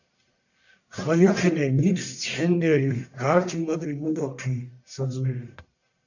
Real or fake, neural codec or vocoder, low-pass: fake; codec, 44.1 kHz, 1.7 kbps, Pupu-Codec; 7.2 kHz